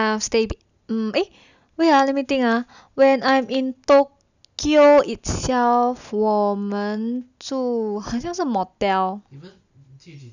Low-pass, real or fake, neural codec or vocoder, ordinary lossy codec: 7.2 kHz; real; none; none